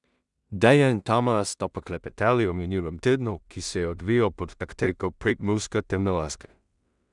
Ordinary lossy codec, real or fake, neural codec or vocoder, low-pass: none; fake; codec, 16 kHz in and 24 kHz out, 0.4 kbps, LongCat-Audio-Codec, two codebook decoder; 10.8 kHz